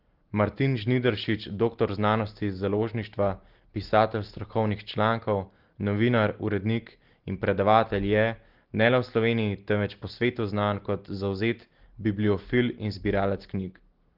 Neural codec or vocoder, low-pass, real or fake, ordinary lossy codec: none; 5.4 kHz; real; Opus, 16 kbps